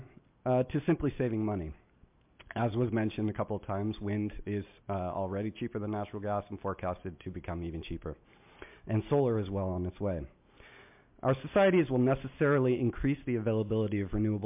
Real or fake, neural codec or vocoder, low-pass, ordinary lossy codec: real; none; 3.6 kHz; MP3, 32 kbps